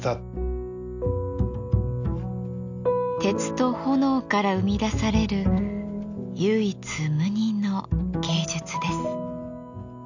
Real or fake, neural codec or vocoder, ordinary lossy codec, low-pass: real; none; none; 7.2 kHz